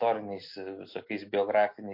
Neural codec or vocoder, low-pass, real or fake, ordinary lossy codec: none; 5.4 kHz; real; MP3, 32 kbps